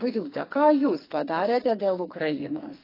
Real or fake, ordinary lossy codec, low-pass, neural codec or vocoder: fake; AAC, 24 kbps; 5.4 kHz; codec, 44.1 kHz, 2.6 kbps, SNAC